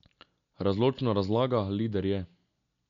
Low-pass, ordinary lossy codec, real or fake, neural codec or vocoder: 7.2 kHz; none; real; none